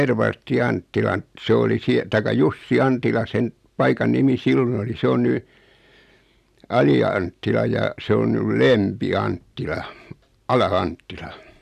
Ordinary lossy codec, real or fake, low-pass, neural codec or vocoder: none; real; 14.4 kHz; none